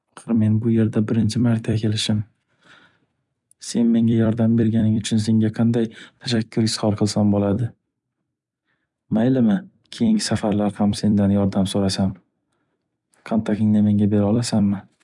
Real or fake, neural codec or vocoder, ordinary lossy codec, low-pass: fake; vocoder, 44.1 kHz, 128 mel bands every 256 samples, BigVGAN v2; none; 10.8 kHz